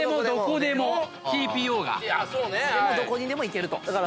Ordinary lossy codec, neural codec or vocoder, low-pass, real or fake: none; none; none; real